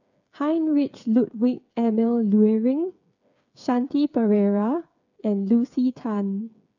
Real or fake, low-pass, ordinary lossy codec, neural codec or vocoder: fake; 7.2 kHz; none; codec, 16 kHz, 8 kbps, FreqCodec, smaller model